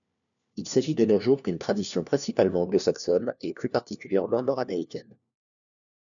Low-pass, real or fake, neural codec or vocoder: 7.2 kHz; fake; codec, 16 kHz, 1 kbps, FunCodec, trained on LibriTTS, 50 frames a second